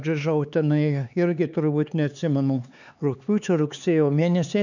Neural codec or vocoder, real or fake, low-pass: codec, 16 kHz, 4 kbps, X-Codec, HuBERT features, trained on LibriSpeech; fake; 7.2 kHz